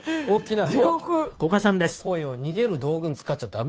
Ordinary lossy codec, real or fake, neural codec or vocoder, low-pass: none; fake; codec, 16 kHz, 2 kbps, FunCodec, trained on Chinese and English, 25 frames a second; none